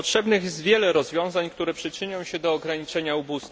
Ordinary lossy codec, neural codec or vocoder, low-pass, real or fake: none; none; none; real